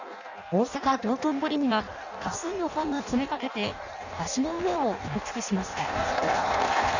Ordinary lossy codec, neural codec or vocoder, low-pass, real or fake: none; codec, 16 kHz in and 24 kHz out, 0.6 kbps, FireRedTTS-2 codec; 7.2 kHz; fake